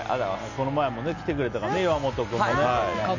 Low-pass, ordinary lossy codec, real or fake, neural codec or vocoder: 7.2 kHz; none; real; none